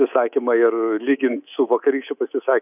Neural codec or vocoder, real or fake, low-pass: codec, 24 kHz, 3.1 kbps, DualCodec; fake; 3.6 kHz